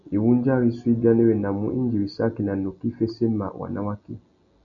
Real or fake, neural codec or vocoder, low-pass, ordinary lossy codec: real; none; 7.2 kHz; AAC, 64 kbps